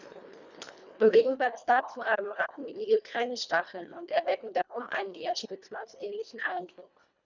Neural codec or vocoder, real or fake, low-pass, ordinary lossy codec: codec, 24 kHz, 1.5 kbps, HILCodec; fake; 7.2 kHz; none